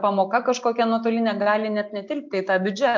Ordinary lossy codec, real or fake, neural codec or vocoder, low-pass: MP3, 48 kbps; real; none; 7.2 kHz